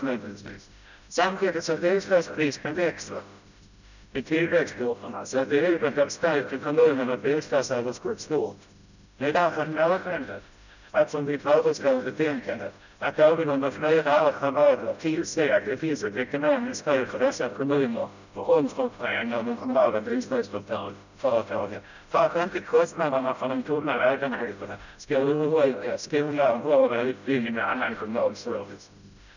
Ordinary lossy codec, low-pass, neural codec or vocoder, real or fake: none; 7.2 kHz; codec, 16 kHz, 0.5 kbps, FreqCodec, smaller model; fake